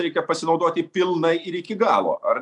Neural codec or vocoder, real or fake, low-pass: none; real; 10.8 kHz